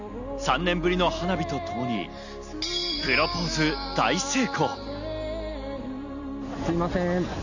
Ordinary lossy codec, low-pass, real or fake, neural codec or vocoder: none; 7.2 kHz; real; none